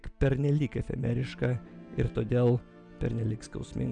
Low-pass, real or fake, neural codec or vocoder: 9.9 kHz; real; none